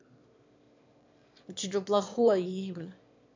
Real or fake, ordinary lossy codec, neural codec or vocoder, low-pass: fake; MP3, 64 kbps; autoencoder, 22.05 kHz, a latent of 192 numbers a frame, VITS, trained on one speaker; 7.2 kHz